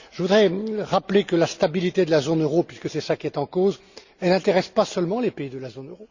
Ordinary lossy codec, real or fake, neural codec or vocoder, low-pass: Opus, 64 kbps; real; none; 7.2 kHz